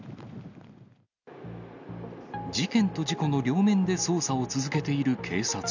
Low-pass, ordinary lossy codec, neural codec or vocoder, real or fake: 7.2 kHz; none; none; real